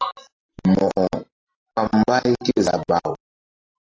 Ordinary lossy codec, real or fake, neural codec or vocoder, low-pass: AAC, 32 kbps; real; none; 7.2 kHz